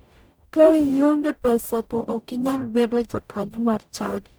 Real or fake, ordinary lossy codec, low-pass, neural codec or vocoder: fake; none; none; codec, 44.1 kHz, 0.9 kbps, DAC